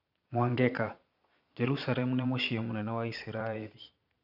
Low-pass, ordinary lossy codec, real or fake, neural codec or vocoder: 5.4 kHz; none; fake; vocoder, 22.05 kHz, 80 mel bands, Vocos